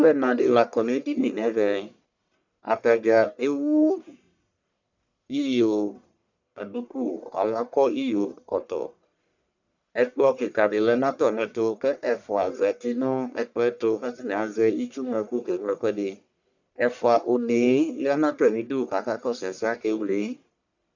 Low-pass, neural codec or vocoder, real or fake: 7.2 kHz; codec, 44.1 kHz, 1.7 kbps, Pupu-Codec; fake